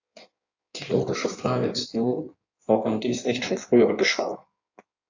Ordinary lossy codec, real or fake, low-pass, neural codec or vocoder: AAC, 48 kbps; fake; 7.2 kHz; codec, 16 kHz in and 24 kHz out, 1.1 kbps, FireRedTTS-2 codec